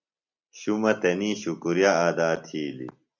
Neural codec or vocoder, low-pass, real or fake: none; 7.2 kHz; real